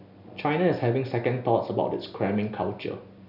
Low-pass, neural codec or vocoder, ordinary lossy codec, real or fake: 5.4 kHz; none; none; real